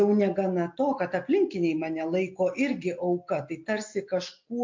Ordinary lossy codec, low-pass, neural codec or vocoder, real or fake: MP3, 48 kbps; 7.2 kHz; none; real